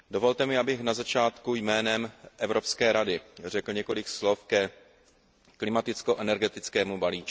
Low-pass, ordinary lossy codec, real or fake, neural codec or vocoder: none; none; real; none